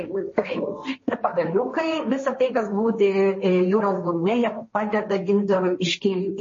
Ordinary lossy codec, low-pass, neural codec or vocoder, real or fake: MP3, 32 kbps; 7.2 kHz; codec, 16 kHz, 1.1 kbps, Voila-Tokenizer; fake